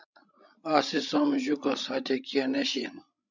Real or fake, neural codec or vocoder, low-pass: fake; codec, 16 kHz, 16 kbps, FreqCodec, larger model; 7.2 kHz